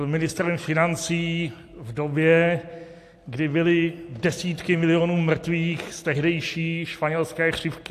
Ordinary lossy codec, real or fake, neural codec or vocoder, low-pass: AAC, 64 kbps; real; none; 14.4 kHz